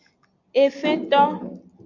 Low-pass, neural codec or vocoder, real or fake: 7.2 kHz; none; real